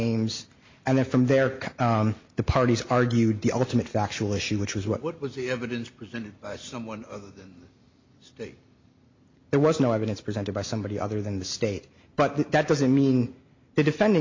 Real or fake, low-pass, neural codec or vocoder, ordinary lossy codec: real; 7.2 kHz; none; MP3, 48 kbps